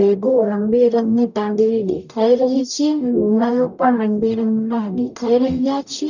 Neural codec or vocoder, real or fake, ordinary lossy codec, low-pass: codec, 44.1 kHz, 0.9 kbps, DAC; fake; none; 7.2 kHz